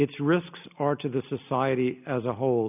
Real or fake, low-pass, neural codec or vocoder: real; 3.6 kHz; none